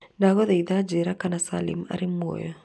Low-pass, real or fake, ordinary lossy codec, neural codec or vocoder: none; real; none; none